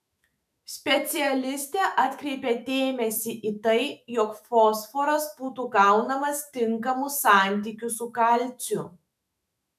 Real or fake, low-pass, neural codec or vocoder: fake; 14.4 kHz; autoencoder, 48 kHz, 128 numbers a frame, DAC-VAE, trained on Japanese speech